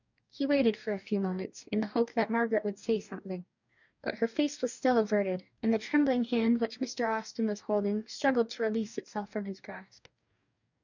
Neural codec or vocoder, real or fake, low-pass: codec, 44.1 kHz, 2.6 kbps, DAC; fake; 7.2 kHz